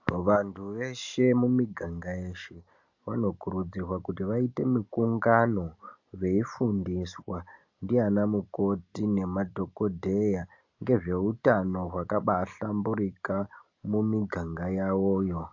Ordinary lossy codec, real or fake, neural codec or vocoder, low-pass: AAC, 48 kbps; real; none; 7.2 kHz